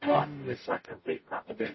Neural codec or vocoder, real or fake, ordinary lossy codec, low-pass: codec, 44.1 kHz, 0.9 kbps, DAC; fake; MP3, 24 kbps; 7.2 kHz